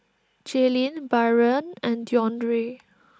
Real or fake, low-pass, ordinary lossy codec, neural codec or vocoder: real; none; none; none